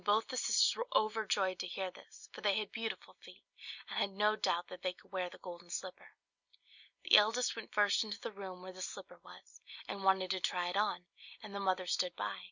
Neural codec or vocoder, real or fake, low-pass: none; real; 7.2 kHz